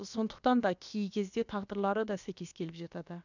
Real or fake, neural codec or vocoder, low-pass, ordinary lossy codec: fake; codec, 16 kHz, about 1 kbps, DyCAST, with the encoder's durations; 7.2 kHz; none